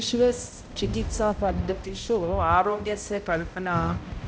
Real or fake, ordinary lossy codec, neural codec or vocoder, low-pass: fake; none; codec, 16 kHz, 0.5 kbps, X-Codec, HuBERT features, trained on balanced general audio; none